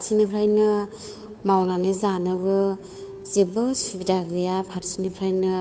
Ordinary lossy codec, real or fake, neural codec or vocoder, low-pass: none; fake; codec, 16 kHz, 2 kbps, FunCodec, trained on Chinese and English, 25 frames a second; none